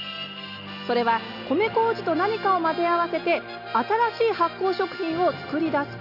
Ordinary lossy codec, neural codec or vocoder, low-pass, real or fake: Opus, 64 kbps; none; 5.4 kHz; real